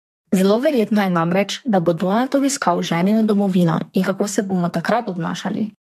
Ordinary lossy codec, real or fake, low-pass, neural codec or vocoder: MP3, 64 kbps; fake; 14.4 kHz; codec, 32 kHz, 1.9 kbps, SNAC